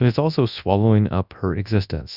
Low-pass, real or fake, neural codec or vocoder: 5.4 kHz; fake; codec, 24 kHz, 0.9 kbps, WavTokenizer, large speech release